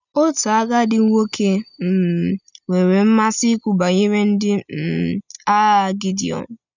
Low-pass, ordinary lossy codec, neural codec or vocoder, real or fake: 7.2 kHz; none; none; real